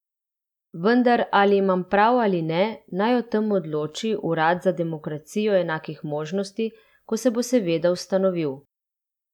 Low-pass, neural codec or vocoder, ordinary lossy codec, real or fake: 19.8 kHz; none; none; real